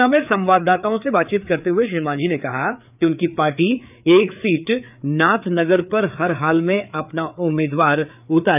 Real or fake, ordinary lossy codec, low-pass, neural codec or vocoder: fake; none; 3.6 kHz; codec, 16 kHz, 4 kbps, FreqCodec, larger model